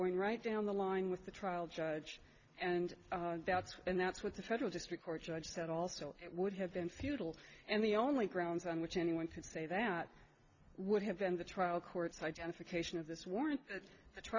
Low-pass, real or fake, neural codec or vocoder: 7.2 kHz; real; none